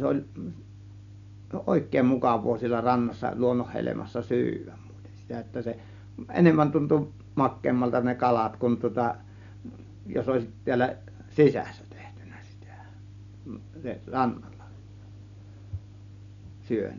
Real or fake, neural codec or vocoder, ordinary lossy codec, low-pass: real; none; none; 7.2 kHz